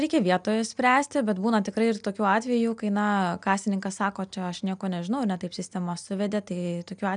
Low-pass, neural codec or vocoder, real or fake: 9.9 kHz; none; real